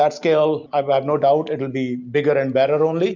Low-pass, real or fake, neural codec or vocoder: 7.2 kHz; real; none